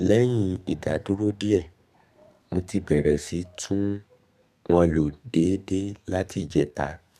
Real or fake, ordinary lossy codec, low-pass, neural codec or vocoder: fake; none; 14.4 kHz; codec, 32 kHz, 1.9 kbps, SNAC